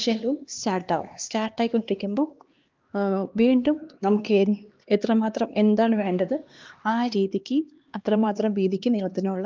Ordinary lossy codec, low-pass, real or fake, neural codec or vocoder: Opus, 24 kbps; 7.2 kHz; fake; codec, 16 kHz, 2 kbps, X-Codec, HuBERT features, trained on LibriSpeech